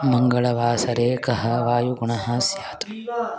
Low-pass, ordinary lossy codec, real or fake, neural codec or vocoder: none; none; real; none